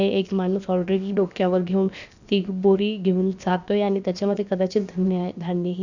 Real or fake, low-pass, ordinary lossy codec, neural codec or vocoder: fake; 7.2 kHz; none; codec, 16 kHz, about 1 kbps, DyCAST, with the encoder's durations